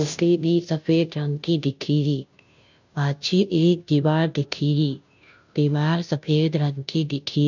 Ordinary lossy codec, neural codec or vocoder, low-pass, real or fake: none; codec, 16 kHz, 0.5 kbps, FunCodec, trained on Chinese and English, 25 frames a second; 7.2 kHz; fake